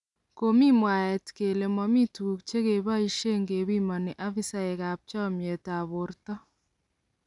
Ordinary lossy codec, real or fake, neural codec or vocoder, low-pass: none; real; none; 10.8 kHz